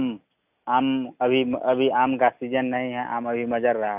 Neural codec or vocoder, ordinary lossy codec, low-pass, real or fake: none; none; 3.6 kHz; real